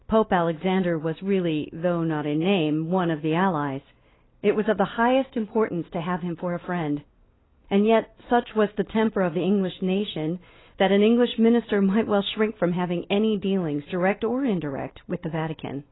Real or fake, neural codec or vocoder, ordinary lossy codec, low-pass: real; none; AAC, 16 kbps; 7.2 kHz